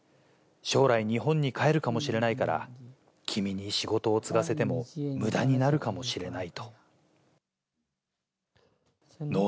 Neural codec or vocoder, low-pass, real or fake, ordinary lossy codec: none; none; real; none